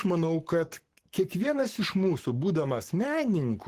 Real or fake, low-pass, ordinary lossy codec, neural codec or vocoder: fake; 14.4 kHz; Opus, 16 kbps; codec, 44.1 kHz, 7.8 kbps, Pupu-Codec